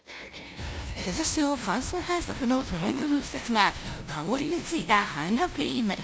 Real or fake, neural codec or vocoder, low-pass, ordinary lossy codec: fake; codec, 16 kHz, 0.5 kbps, FunCodec, trained on LibriTTS, 25 frames a second; none; none